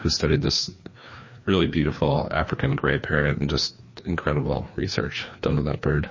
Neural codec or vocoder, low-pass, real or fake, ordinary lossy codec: codec, 16 kHz, 2 kbps, FreqCodec, larger model; 7.2 kHz; fake; MP3, 32 kbps